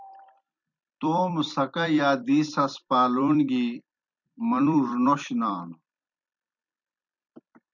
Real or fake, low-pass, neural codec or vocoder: fake; 7.2 kHz; vocoder, 44.1 kHz, 128 mel bands every 256 samples, BigVGAN v2